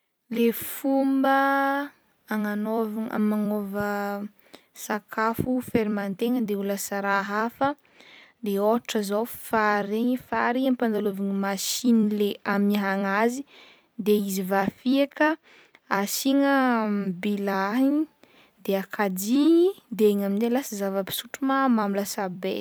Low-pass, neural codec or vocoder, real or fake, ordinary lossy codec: none; vocoder, 44.1 kHz, 128 mel bands every 256 samples, BigVGAN v2; fake; none